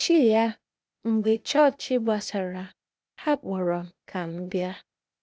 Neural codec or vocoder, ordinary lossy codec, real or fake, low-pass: codec, 16 kHz, 0.8 kbps, ZipCodec; none; fake; none